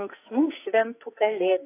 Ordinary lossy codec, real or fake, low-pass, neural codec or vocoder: AAC, 24 kbps; fake; 3.6 kHz; codec, 44.1 kHz, 2.6 kbps, SNAC